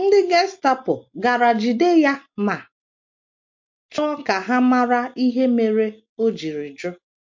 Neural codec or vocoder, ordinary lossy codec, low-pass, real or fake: none; MP3, 48 kbps; 7.2 kHz; real